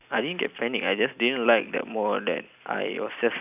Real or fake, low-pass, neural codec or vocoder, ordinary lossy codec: real; 3.6 kHz; none; none